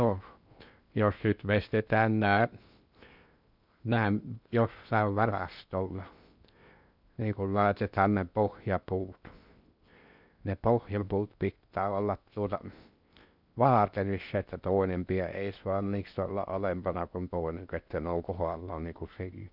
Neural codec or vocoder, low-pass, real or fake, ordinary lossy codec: codec, 16 kHz in and 24 kHz out, 0.6 kbps, FocalCodec, streaming, 2048 codes; 5.4 kHz; fake; none